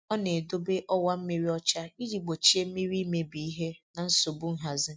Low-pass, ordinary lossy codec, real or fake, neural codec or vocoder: none; none; real; none